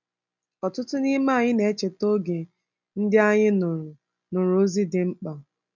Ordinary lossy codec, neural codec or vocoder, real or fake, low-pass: none; none; real; 7.2 kHz